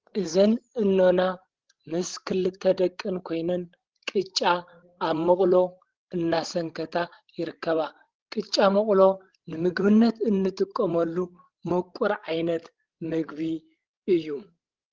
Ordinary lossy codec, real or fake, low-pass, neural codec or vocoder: Opus, 16 kbps; fake; 7.2 kHz; codec, 16 kHz, 16 kbps, FreqCodec, larger model